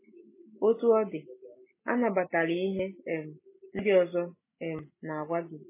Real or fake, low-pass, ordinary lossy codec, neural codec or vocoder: real; 3.6 kHz; MP3, 16 kbps; none